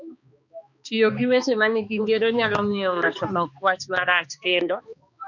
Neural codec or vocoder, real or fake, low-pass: codec, 16 kHz, 2 kbps, X-Codec, HuBERT features, trained on balanced general audio; fake; 7.2 kHz